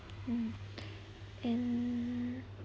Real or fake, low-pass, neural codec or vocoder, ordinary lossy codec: real; none; none; none